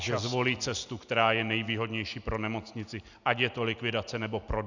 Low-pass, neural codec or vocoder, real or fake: 7.2 kHz; none; real